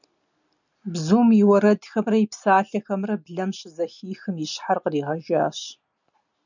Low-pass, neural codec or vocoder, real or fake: 7.2 kHz; none; real